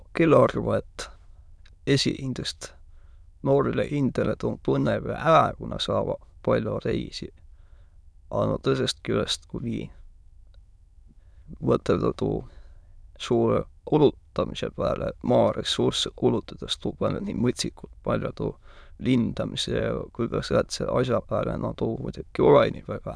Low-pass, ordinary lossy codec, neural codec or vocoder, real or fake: none; none; autoencoder, 22.05 kHz, a latent of 192 numbers a frame, VITS, trained on many speakers; fake